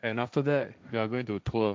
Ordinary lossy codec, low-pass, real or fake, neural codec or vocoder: none; none; fake; codec, 16 kHz, 1.1 kbps, Voila-Tokenizer